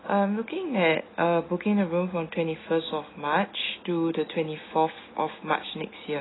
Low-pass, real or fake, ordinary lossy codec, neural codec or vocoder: 7.2 kHz; real; AAC, 16 kbps; none